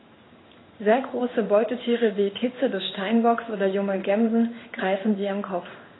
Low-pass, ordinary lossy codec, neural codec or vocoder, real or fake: 7.2 kHz; AAC, 16 kbps; codec, 16 kHz in and 24 kHz out, 1 kbps, XY-Tokenizer; fake